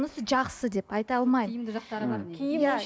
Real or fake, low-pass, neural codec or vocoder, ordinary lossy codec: real; none; none; none